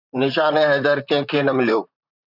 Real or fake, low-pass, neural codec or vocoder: fake; 5.4 kHz; vocoder, 44.1 kHz, 128 mel bands, Pupu-Vocoder